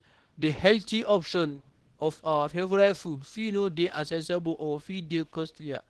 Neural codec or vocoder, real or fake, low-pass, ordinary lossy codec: codec, 24 kHz, 0.9 kbps, WavTokenizer, small release; fake; 10.8 kHz; Opus, 16 kbps